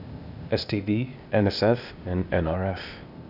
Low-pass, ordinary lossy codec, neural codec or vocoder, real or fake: 5.4 kHz; none; codec, 16 kHz, 0.8 kbps, ZipCodec; fake